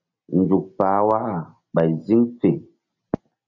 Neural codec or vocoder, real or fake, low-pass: none; real; 7.2 kHz